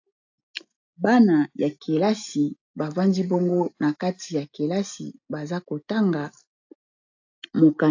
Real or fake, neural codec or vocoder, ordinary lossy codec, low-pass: real; none; AAC, 48 kbps; 7.2 kHz